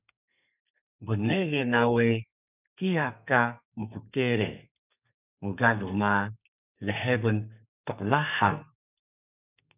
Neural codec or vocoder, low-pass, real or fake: codec, 32 kHz, 1.9 kbps, SNAC; 3.6 kHz; fake